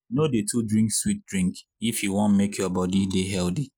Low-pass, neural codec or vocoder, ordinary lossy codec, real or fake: none; none; none; real